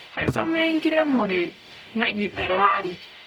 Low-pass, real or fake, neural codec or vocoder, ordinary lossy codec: 19.8 kHz; fake; codec, 44.1 kHz, 0.9 kbps, DAC; none